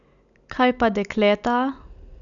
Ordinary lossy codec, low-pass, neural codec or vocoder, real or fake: none; 7.2 kHz; none; real